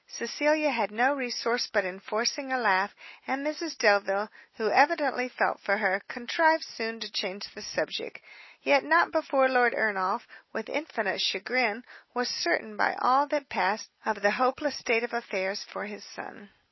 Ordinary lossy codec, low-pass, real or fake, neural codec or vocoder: MP3, 24 kbps; 7.2 kHz; real; none